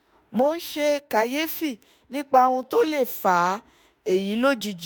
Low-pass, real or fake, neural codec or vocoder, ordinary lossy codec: none; fake; autoencoder, 48 kHz, 32 numbers a frame, DAC-VAE, trained on Japanese speech; none